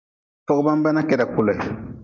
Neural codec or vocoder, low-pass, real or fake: none; 7.2 kHz; real